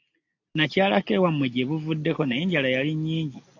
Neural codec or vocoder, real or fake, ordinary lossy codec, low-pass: none; real; AAC, 48 kbps; 7.2 kHz